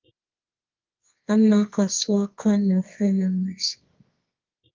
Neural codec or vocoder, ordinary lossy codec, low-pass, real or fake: codec, 24 kHz, 0.9 kbps, WavTokenizer, medium music audio release; Opus, 24 kbps; 7.2 kHz; fake